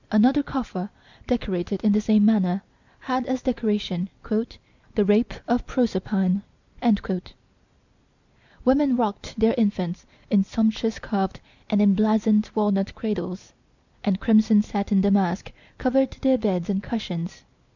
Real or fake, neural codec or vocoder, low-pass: real; none; 7.2 kHz